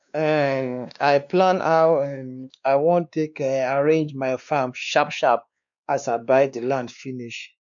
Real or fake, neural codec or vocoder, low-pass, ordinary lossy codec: fake; codec, 16 kHz, 2 kbps, X-Codec, WavLM features, trained on Multilingual LibriSpeech; 7.2 kHz; none